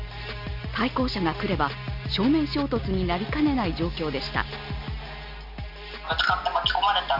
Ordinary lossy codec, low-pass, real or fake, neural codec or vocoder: none; 5.4 kHz; real; none